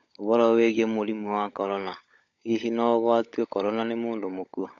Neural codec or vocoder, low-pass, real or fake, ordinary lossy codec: codec, 16 kHz, 16 kbps, FunCodec, trained on LibriTTS, 50 frames a second; 7.2 kHz; fake; none